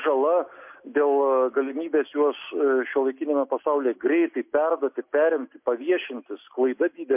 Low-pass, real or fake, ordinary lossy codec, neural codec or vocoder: 3.6 kHz; real; MP3, 32 kbps; none